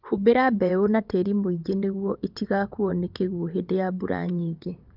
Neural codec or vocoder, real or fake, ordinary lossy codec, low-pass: vocoder, 22.05 kHz, 80 mel bands, WaveNeXt; fake; Opus, 24 kbps; 5.4 kHz